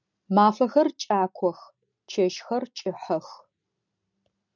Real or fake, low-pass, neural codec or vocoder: real; 7.2 kHz; none